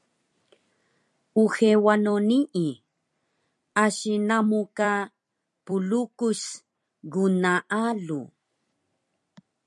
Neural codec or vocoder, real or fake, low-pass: vocoder, 44.1 kHz, 128 mel bands every 256 samples, BigVGAN v2; fake; 10.8 kHz